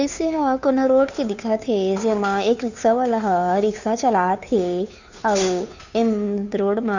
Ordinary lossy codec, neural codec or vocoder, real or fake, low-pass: none; codec, 16 kHz, 2 kbps, FunCodec, trained on Chinese and English, 25 frames a second; fake; 7.2 kHz